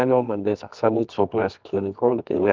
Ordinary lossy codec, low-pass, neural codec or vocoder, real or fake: Opus, 24 kbps; 7.2 kHz; codec, 24 kHz, 0.9 kbps, WavTokenizer, medium music audio release; fake